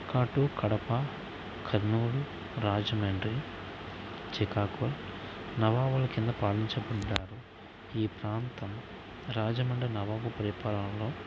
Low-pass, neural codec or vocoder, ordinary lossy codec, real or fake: none; none; none; real